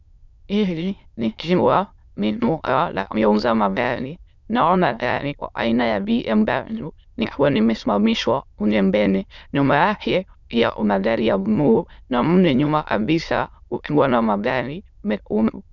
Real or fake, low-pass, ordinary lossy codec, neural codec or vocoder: fake; 7.2 kHz; Opus, 64 kbps; autoencoder, 22.05 kHz, a latent of 192 numbers a frame, VITS, trained on many speakers